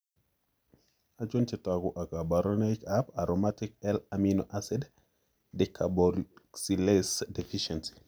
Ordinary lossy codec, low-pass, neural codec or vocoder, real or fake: none; none; none; real